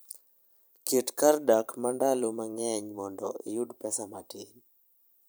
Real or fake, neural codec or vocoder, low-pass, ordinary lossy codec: fake; vocoder, 44.1 kHz, 128 mel bands every 512 samples, BigVGAN v2; none; none